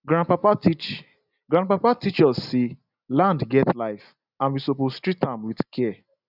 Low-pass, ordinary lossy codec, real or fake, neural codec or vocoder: 5.4 kHz; none; real; none